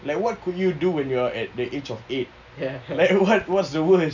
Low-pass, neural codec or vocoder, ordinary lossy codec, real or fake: 7.2 kHz; none; none; real